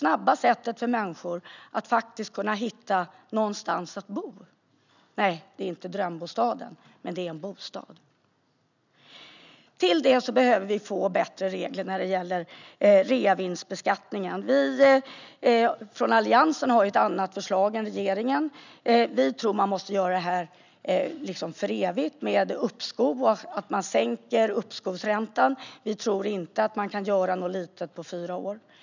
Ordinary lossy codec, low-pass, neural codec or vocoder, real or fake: none; 7.2 kHz; none; real